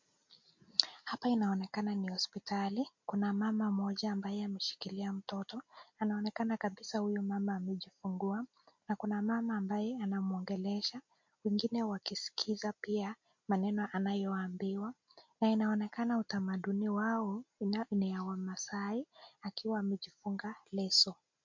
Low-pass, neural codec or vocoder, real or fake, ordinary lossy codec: 7.2 kHz; none; real; MP3, 48 kbps